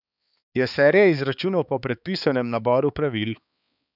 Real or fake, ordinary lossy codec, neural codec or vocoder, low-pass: fake; none; codec, 16 kHz, 4 kbps, X-Codec, HuBERT features, trained on balanced general audio; 5.4 kHz